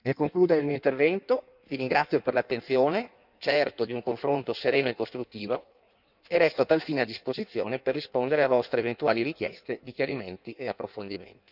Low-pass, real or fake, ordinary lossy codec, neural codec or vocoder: 5.4 kHz; fake; none; codec, 16 kHz in and 24 kHz out, 1.1 kbps, FireRedTTS-2 codec